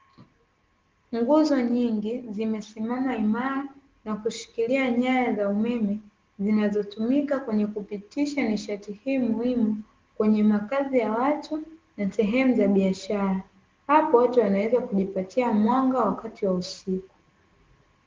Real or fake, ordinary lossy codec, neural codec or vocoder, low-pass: real; Opus, 16 kbps; none; 7.2 kHz